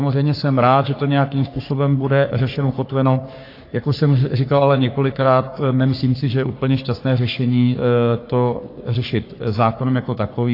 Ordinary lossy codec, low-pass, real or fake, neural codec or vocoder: AAC, 32 kbps; 5.4 kHz; fake; codec, 44.1 kHz, 3.4 kbps, Pupu-Codec